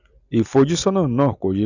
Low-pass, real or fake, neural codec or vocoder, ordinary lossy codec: 7.2 kHz; real; none; none